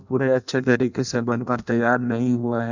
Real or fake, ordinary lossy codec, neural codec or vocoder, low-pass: fake; none; codec, 16 kHz in and 24 kHz out, 0.6 kbps, FireRedTTS-2 codec; 7.2 kHz